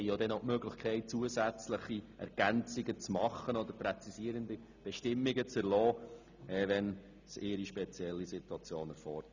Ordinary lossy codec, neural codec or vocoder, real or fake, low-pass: none; none; real; 7.2 kHz